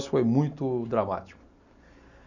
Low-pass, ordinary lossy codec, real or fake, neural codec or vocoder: 7.2 kHz; none; real; none